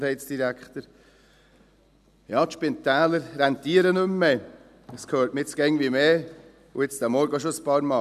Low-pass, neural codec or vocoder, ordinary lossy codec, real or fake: 14.4 kHz; none; none; real